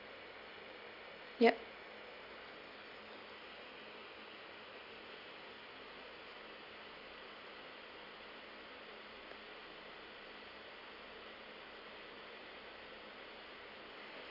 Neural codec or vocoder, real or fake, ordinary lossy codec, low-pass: none; real; none; 5.4 kHz